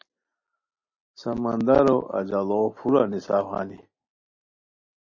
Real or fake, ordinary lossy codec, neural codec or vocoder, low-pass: real; MP3, 32 kbps; none; 7.2 kHz